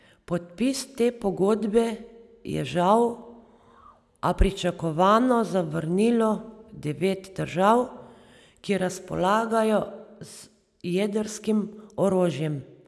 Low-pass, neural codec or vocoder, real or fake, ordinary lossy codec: none; none; real; none